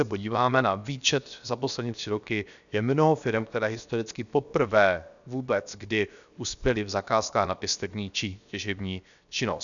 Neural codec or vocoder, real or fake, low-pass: codec, 16 kHz, 0.7 kbps, FocalCodec; fake; 7.2 kHz